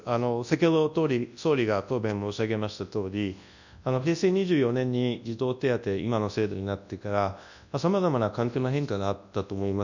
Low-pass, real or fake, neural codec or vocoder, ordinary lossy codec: 7.2 kHz; fake; codec, 24 kHz, 0.9 kbps, WavTokenizer, large speech release; none